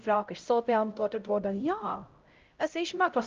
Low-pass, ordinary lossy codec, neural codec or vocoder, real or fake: 7.2 kHz; Opus, 24 kbps; codec, 16 kHz, 0.5 kbps, X-Codec, HuBERT features, trained on LibriSpeech; fake